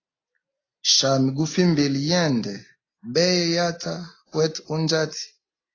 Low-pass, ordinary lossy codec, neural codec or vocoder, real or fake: 7.2 kHz; AAC, 32 kbps; none; real